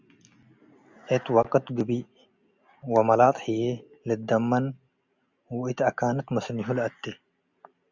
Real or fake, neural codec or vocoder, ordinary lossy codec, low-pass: real; none; Opus, 64 kbps; 7.2 kHz